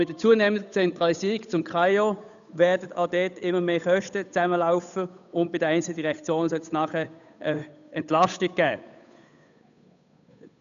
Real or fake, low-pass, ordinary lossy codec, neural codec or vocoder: fake; 7.2 kHz; none; codec, 16 kHz, 8 kbps, FunCodec, trained on Chinese and English, 25 frames a second